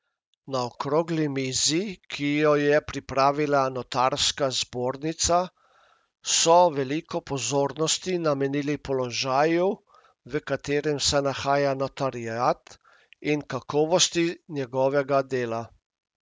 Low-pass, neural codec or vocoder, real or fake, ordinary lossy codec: none; none; real; none